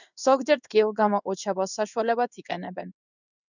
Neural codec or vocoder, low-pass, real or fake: codec, 16 kHz in and 24 kHz out, 1 kbps, XY-Tokenizer; 7.2 kHz; fake